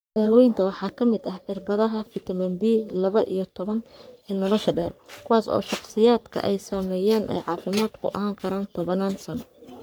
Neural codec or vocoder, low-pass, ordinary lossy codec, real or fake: codec, 44.1 kHz, 3.4 kbps, Pupu-Codec; none; none; fake